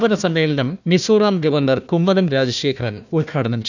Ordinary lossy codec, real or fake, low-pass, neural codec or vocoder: none; fake; 7.2 kHz; codec, 16 kHz, 1 kbps, FunCodec, trained on Chinese and English, 50 frames a second